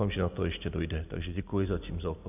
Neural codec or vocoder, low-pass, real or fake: none; 3.6 kHz; real